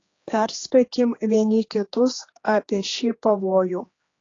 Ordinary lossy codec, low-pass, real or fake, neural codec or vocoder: AAC, 32 kbps; 7.2 kHz; fake; codec, 16 kHz, 2 kbps, X-Codec, HuBERT features, trained on general audio